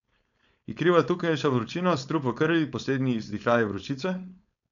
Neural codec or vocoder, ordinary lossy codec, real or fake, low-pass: codec, 16 kHz, 4.8 kbps, FACodec; none; fake; 7.2 kHz